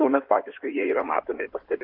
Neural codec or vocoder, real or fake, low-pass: codec, 16 kHz in and 24 kHz out, 2.2 kbps, FireRedTTS-2 codec; fake; 5.4 kHz